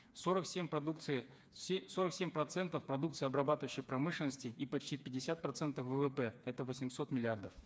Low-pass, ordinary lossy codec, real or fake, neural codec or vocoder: none; none; fake; codec, 16 kHz, 4 kbps, FreqCodec, smaller model